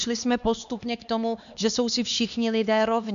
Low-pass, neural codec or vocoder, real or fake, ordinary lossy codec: 7.2 kHz; codec, 16 kHz, 2 kbps, X-Codec, HuBERT features, trained on LibriSpeech; fake; AAC, 96 kbps